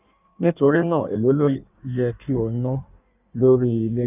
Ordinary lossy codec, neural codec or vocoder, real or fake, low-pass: none; codec, 16 kHz in and 24 kHz out, 1.1 kbps, FireRedTTS-2 codec; fake; 3.6 kHz